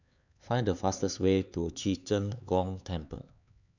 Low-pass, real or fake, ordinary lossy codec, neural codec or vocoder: 7.2 kHz; fake; none; codec, 16 kHz, 4 kbps, X-Codec, HuBERT features, trained on LibriSpeech